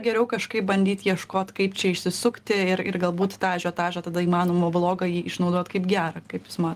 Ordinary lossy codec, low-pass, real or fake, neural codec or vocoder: Opus, 24 kbps; 14.4 kHz; real; none